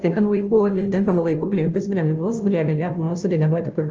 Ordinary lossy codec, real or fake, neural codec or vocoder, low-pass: Opus, 24 kbps; fake; codec, 16 kHz, 0.5 kbps, FunCodec, trained on Chinese and English, 25 frames a second; 7.2 kHz